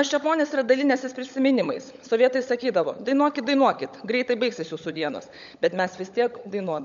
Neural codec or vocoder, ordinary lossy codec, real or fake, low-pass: codec, 16 kHz, 16 kbps, FunCodec, trained on LibriTTS, 50 frames a second; MP3, 64 kbps; fake; 7.2 kHz